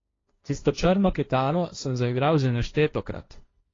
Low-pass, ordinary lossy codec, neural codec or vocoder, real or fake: 7.2 kHz; AAC, 32 kbps; codec, 16 kHz, 1.1 kbps, Voila-Tokenizer; fake